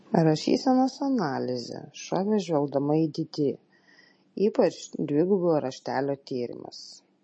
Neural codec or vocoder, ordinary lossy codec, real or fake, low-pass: none; MP3, 32 kbps; real; 9.9 kHz